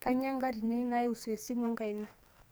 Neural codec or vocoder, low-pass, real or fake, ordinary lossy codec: codec, 44.1 kHz, 2.6 kbps, SNAC; none; fake; none